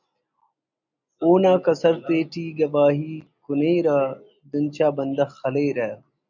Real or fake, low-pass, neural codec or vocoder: real; 7.2 kHz; none